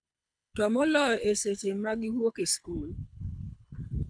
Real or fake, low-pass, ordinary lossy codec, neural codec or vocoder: fake; 9.9 kHz; none; codec, 24 kHz, 6 kbps, HILCodec